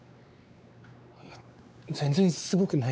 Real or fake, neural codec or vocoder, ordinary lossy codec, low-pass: fake; codec, 16 kHz, 4 kbps, X-Codec, WavLM features, trained on Multilingual LibriSpeech; none; none